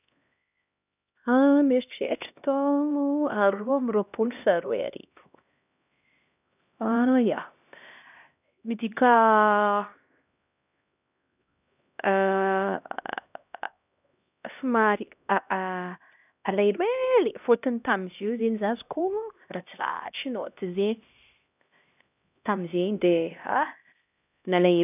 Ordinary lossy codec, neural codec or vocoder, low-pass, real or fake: none; codec, 16 kHz, 1 kbps, X-Codec, HuBERT features, trained on LibriSpeech; 3.6 kHz; fake